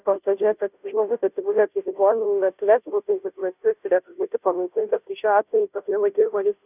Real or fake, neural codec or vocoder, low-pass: fake; codec, 16 kHz, 0.5 kbps, FunCodec, trained on Chinese and English, 25 frames a second; 3.6 kHz